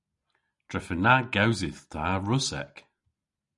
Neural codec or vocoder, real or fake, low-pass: none; real; 10.8 kHz